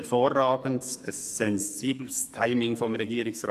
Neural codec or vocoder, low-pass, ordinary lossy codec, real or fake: codec, 44.1 kHz, 2.6 kbps, SNAC; 14.4 kHz; AAC, 96 kbps; fake